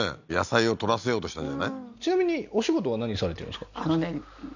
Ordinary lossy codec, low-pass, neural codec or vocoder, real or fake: none; 7.2 kHz; none; real